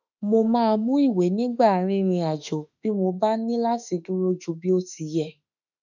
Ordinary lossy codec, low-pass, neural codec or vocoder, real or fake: none; 7.2 kHz; autoencoder, 48 kHz, 32 numbers a frame, DAC-VAE, trained on Japanese speech; fake